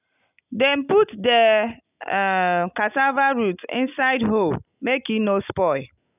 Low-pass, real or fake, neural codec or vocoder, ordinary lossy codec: 3.6 kHz; real; none; none